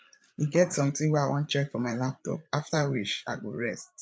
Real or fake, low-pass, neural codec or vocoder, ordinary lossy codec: fake; none; codec, 16 kHz, 8 kbps, FreqCodec, larger model; none